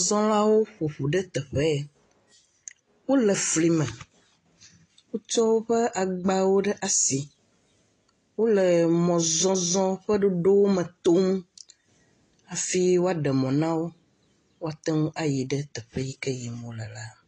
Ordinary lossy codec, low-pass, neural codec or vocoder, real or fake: AAC, 32 kbps; 9.9 kHz; none; real